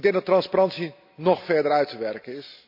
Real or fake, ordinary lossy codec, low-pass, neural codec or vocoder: real; MP3, 48 kbps; 5.4 kHz; none